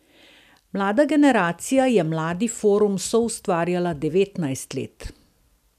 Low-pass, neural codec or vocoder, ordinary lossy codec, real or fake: 14.4 kHz; none; none; real